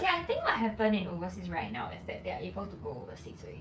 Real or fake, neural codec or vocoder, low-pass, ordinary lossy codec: fake; codec, 16 kHz, 8 kbps, FreqCodec, smaller model; none; none